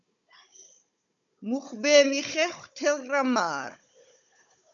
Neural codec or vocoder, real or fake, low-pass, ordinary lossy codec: codec, 16 kHz, 16 kbps, FunCodec, trained on Chinese and English, 50 frames a second; fake; 7.2 kHz; MP3, 96 kbps